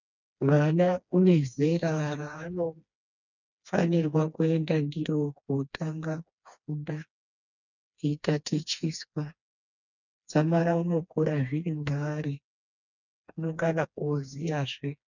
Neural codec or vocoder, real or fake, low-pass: codec, 16 kHz, 2 kbps, FreqCodec, smaller model; fake; 7.2 kHz